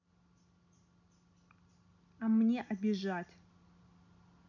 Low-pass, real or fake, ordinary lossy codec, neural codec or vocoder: 7.2 kHz; real; none; none